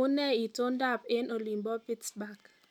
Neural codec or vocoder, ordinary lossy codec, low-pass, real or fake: none; none; 19.8 kHz; real